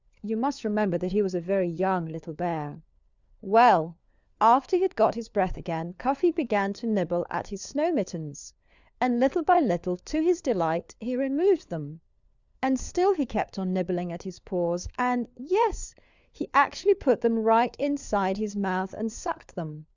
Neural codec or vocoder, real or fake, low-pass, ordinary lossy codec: codec, 16 kHz, 4 kbps, FunCodec, trained on LibriTTS, 50 frames a second; fake; 7.2 kHz; Opus, 64 kbps